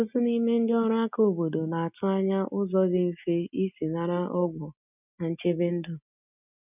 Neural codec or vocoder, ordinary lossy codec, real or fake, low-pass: none; none; real; 3.6 kHz